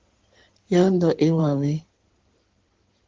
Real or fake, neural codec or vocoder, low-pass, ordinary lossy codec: fake; codec, 16 kHz in and 24 kHz out, 2.2 kbps, FireRedTTS-2 codec; 7.2 kHz; Opus, 16 kbps